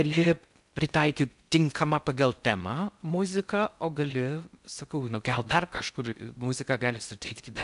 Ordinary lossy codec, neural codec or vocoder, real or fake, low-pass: AAC, 96 kbps; codec, 16 kHz in and 24 kHz out, 0.6 kbps, FocalCodec, streaming, 4096 codes; fake; 10.8 kHz